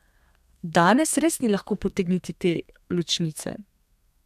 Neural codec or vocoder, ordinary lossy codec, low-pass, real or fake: codec, 32 kHz, 1.9 kbps, SNAC; none; 14.4 kHz; fake